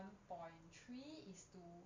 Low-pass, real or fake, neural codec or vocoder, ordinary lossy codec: 7.2 kHz; real; none; MP3, 64 kbps